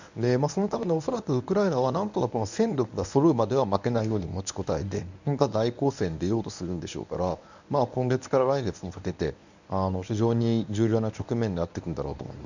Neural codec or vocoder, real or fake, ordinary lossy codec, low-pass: codec, 24 kHz, 0.9 kbps, WavTokenizer, medium speech release version 1; fake; none; 7.2 kHz